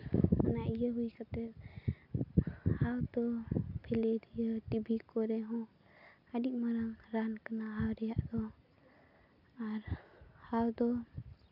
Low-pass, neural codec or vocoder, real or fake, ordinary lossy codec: 5.4 kHz; none; real; none